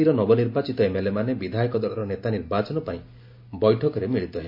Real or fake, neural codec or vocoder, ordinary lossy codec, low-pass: real; none; MP3, 32 kbps; 5.4 kHz